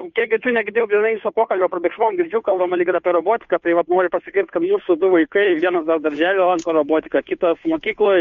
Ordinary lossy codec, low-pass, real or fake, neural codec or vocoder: MP3, 48 kbps; 7.2 kHz; fake; codec, 16 kHz, 2 kbps, FunCodec, trained on Chinese and English, 25 frames a second